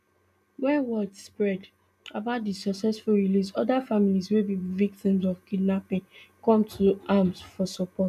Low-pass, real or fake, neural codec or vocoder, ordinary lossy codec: 14.4 kHz; real; none; none